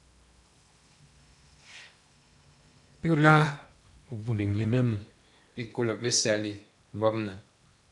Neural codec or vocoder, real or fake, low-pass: codec, 16 kHz in and 24 kHz out, 0.8 kbps, FocalCodec, streaming, 65536 codes; fake; 10.8 kHz